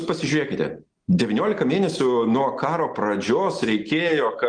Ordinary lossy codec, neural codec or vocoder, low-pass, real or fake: Opus, 24 kbps; none; 9.9 kHz; real